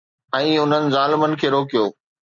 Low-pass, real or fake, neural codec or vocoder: 7.2 kHz; real; none